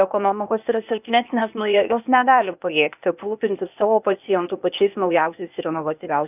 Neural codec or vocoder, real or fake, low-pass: codec, 16 kHz, 0.8 kbps, ZipCodec; fake; 3.6 kHz